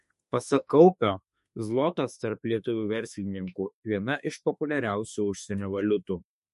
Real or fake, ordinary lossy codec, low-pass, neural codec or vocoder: fake; MP3, 48 kbps; 14.4 kHz; autoencoder, 48 kHz, 32 numbers a frame, DAC-VAE, trained on Japanese speech